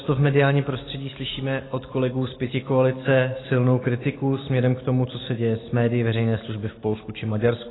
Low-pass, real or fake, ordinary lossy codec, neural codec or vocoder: 7.2 kHz; real; AAC, 16 kbps; none